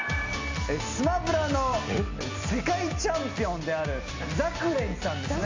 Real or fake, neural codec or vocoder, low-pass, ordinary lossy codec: real; none; 7.2 kHz; MP3, 48 kbps